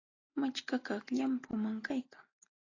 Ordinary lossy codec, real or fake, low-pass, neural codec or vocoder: AAC, 32 kbps; real; 7.2 kHz; none